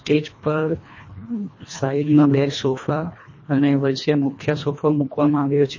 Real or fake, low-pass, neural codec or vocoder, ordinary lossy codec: fake; 7.2 kHz; codec, 24 kHz, 1.5 kbps, HILCodec; MP3, 32 kbps